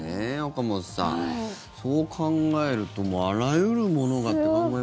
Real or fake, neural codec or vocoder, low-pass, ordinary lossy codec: real; none; none; none